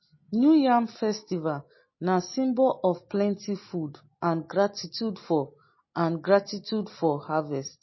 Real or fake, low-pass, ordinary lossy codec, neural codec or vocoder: real; 7.2 kHz; MP3, 24 kbps; none